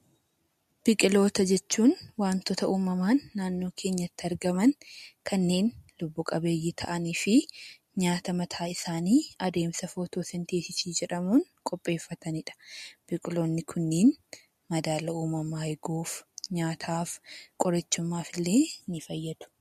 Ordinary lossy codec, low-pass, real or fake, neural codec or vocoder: MP3, 64 kbps; 14.4 kHz; real; none